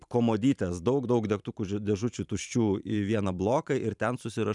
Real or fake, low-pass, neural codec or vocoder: fake; 10.8 kHz; vocoder, 44.1 kHz, 128 mel bands every 512 samples, BigVGAN v2